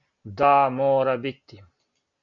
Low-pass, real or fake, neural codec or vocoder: 7.2 kHz; real; none